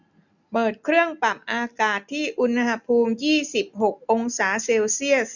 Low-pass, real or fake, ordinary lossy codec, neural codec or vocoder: 7.2 kHz; real; none; none